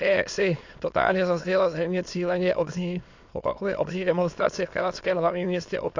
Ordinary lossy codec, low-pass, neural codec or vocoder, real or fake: AAC, 48 kbps; 7.2 kHz; autoencoder, 22.05 kHz, a latent of 192 numbers a frame, VITS, trained on many speakers; fake